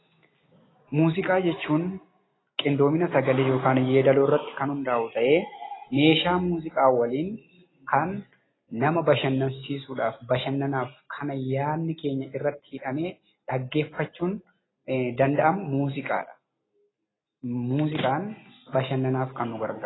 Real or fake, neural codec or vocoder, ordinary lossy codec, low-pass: real; none; AAC, 16 kbps; 7.2 kHz